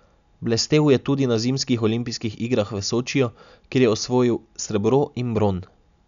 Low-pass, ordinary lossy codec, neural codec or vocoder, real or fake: 7.2 kHz; none; none; real